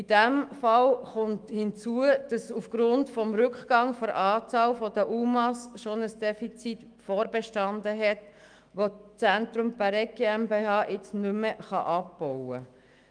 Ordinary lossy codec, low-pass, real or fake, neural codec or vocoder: Opus, 32 kbps; 9.9 kHz; fake; autoencoder, 48 kHz, 128 numbers a frame, DAC-VAE, trained on Japanese speech